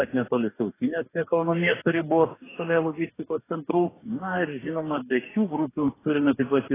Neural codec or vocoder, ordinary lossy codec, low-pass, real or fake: codec, 44.1 kHz, 2.6 kbps, DAC; AAC, 16 kbps; 3.6 kHz; fake